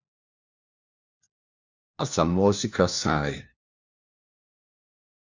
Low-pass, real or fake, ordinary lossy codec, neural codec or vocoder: 7.2 kHz; fake; Opus, 64 kbps; codec, 16 kHz, 1 kbps, FunCodec, trained on LibriTTS, 50 frames a second